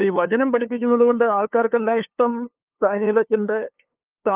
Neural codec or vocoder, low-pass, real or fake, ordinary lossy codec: codec, 16 kHz, 2 kbps, FunCodec, trained on LibriTTS, 25 frames a second; 3.6 kHz; fake; Opus, 24 kbps